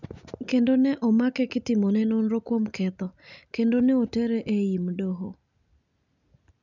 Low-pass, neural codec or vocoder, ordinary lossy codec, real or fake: 7.2 kHz; none; none; real